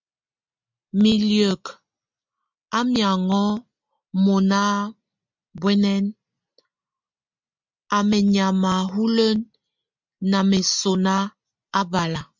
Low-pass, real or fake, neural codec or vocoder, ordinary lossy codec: 7.2 kHz; real; none; MP3, 64 kbps